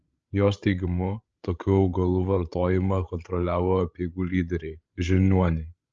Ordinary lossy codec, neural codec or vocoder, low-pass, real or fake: Opus, 24 kbps; codec, 16 kHz, 16 kbps, FreqCodec, larger model; 7.2 kHz; fake